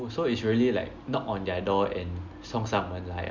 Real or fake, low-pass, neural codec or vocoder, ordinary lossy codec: real; 7.2 kHz; none; none